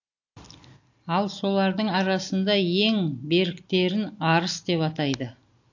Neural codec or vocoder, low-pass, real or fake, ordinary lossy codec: none; 7.2 kHz; real; AAC, 48 kbps